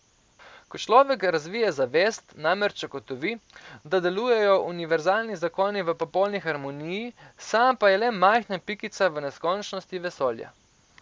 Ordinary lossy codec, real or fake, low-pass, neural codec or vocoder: none; real; none; none